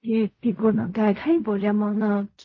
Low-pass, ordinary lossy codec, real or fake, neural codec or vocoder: 7.2 kHz; MP3, 32 kbps; fake; codec, 16 kHz in and 24 kHz out, 0.4 kbps, LongCat-Audio-Codec, fine tuned four codebook decoder